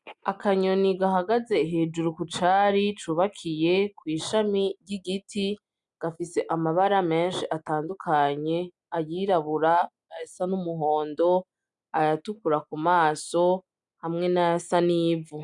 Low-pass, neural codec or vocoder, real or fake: 10.8 kHz; none; real